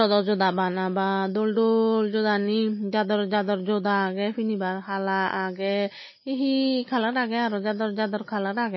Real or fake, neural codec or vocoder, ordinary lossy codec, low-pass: real; none; MP3, 24 kbps; 7.2 kHz